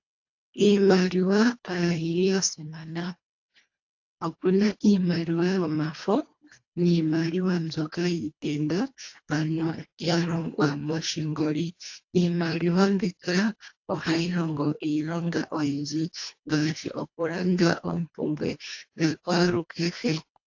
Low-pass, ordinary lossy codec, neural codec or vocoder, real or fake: 7.2 kHz; AAC, 48 kbps; codec, 24 kHz, 1.5 kbps, HILCodec; fake